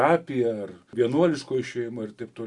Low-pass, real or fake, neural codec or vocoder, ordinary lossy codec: 10.8 kHz; real; none; Opus, 64 kbps